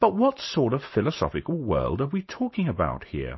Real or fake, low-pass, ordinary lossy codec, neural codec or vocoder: real; 7.2 kHz; MP3, 24 kbps; none